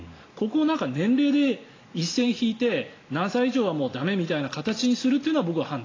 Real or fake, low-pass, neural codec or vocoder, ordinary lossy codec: real; 7.2 kHz; none; AAC, 32 kbps